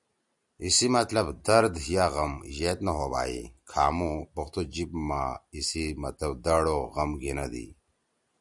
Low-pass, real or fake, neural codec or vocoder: 10.8 kHz; real; none